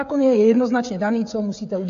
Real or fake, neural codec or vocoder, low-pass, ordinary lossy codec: fake; codec, 16 kHz, 16 kbps, FreqCodec, smaller model; 7.2 kHz; AAC, 48 kbps